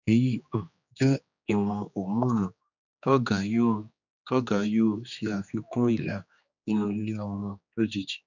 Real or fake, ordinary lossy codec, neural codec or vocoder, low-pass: fake; none; codec, 16 kHz, 2 kbps, X-Codec, HuBERT features, trained on general audio; 7.2 kHz